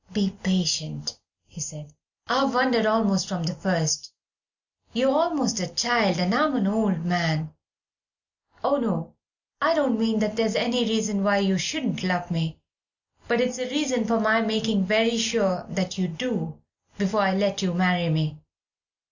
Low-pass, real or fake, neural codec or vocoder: 7.2 kHz; real; none